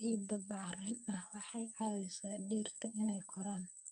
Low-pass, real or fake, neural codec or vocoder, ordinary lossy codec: 10.8 kHz; fake; codec, 44.1 kHz, 2.6 kbps, SNAC; none